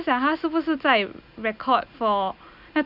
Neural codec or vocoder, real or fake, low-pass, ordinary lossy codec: none; real; 5.4 kHz; none